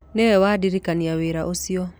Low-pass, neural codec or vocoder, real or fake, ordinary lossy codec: none; none; real; none